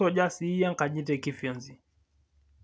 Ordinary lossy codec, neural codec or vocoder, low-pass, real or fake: none; none; none; real